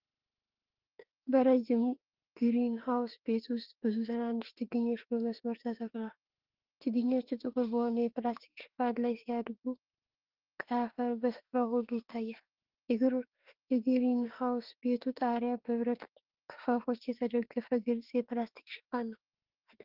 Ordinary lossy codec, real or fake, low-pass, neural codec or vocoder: Opus, 16 kbps; fake; 5.4 kHz; autoencoder, 48 kHz, 32 numbers a frame, DAC-VAE, trained on Japanese speech